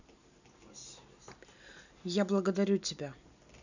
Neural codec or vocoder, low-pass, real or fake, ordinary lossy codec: none; 7.2 kHz; real; none